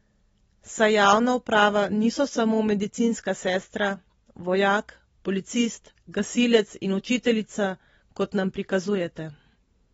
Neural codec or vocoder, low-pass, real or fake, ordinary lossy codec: none; 19.8 kHz; real; AAC, 24 kbps